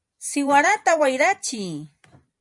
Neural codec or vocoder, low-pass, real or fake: vocoder, 44.1 kHz, 128 mel bands every 512 samples, BigVGAN v2; 10.8 kHz; fake